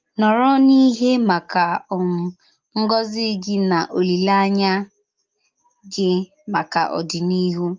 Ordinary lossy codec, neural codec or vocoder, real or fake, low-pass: Opus, 32 kbps; none; real; 7.2 kHz